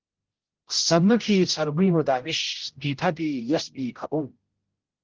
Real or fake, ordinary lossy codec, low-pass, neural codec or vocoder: fake; Opus, 16 kbps; 7.2 kHz; codec, 16 kHz, 0.5 kbps, X-Codec, HuBERT features, trained on general audio